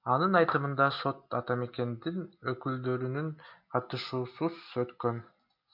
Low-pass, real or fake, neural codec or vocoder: 5.4 kHz; real; none